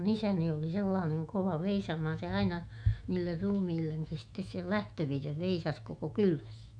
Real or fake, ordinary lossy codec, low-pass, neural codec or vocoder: fake; none; 9.9 kHz; autoencoder, 48 kHz, 128 numbers a frame, DAC-VAE, trained on Japanese speech